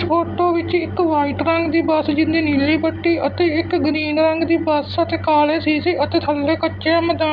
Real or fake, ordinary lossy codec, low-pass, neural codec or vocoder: real; none; none; none